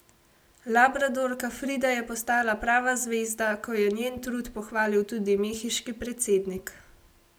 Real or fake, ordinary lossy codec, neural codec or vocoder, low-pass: real; none; none; none